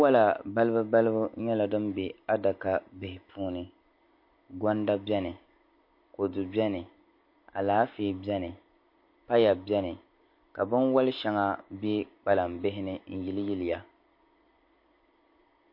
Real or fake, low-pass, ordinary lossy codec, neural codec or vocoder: real; 5.4 kHz; MP3, 32 kbps; none